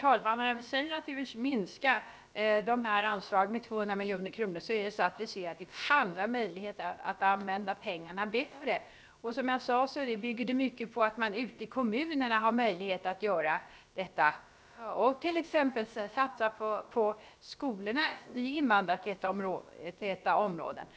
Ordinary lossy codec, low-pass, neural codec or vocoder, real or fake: none; none; codec, 16 kHz, about 1 kbps, DyCAST, with the encoder's durations; fake